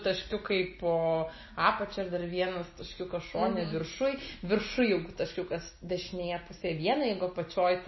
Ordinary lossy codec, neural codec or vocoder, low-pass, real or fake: MP3, 24 kbps; none; 7.2 kHz; real